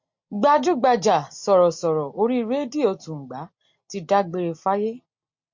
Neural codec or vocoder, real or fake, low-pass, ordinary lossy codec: none; real; 7.2 kHz; MP3, 48 kbps